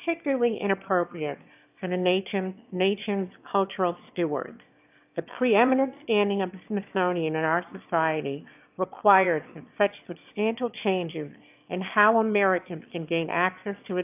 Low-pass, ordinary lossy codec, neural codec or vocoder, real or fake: 3.6 kHz; AAC, 32 kbps; autoencoder, 22.05 kHz, a latent of 192 numbers a frame, VITS, trained on one speaker; fake